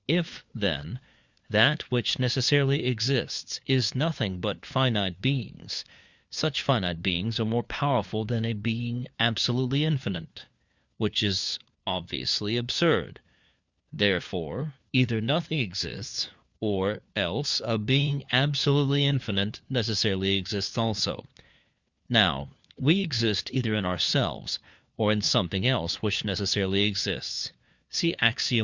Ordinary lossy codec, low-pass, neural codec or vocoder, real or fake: Opus, 64 kbps; 7.2 kHz; codec, 16 kHz, 4 kbps, FunCodec, trained on LibriTTS, 50 frames a second; fake